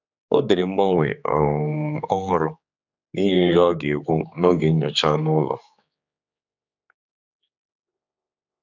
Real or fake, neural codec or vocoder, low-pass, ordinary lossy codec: fake; codec, 16 kHz, 4 kbps, X-Codec, HuBERT features, trained on general audio; 7.2 kHz; none